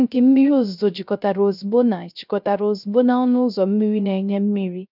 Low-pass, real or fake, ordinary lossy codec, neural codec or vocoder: 5.4 kHz; fake; none; codec, 16 kHz, 0.3 kbps, FocalCodec